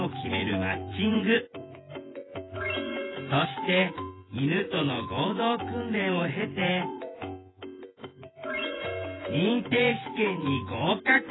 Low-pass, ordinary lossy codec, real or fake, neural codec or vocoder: 7.2 kHz; AAC, 16 kbps; fake; vocoder, 24 kHz, 100 mel bands, Vocos